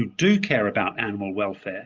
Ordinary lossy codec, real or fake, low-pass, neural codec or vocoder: Opus, 24 kbps; real; 7.2 kHz; none